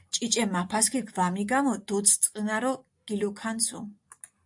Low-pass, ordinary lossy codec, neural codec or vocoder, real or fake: 10.8 kHz; AAC, 64 kbps; none; real